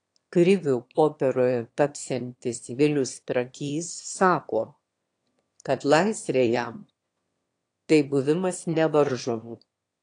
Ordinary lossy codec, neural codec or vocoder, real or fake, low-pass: AAC, 48 kbps; autoencoder, 22.05 kHz, a latent of 192 numbers a frame, VITS, trained on one speaker; fake; 9.9 kHz